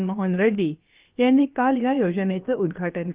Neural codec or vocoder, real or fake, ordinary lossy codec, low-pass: codec, 16 kHz, about 1 kbps, DyCAST, with the encoder's durations; fake; Opus, 32 kbps; 3.6 kHz